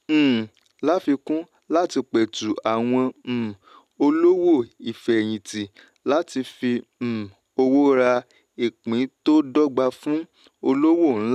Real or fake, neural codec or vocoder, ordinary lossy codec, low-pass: real; none; none; 14.4 kHz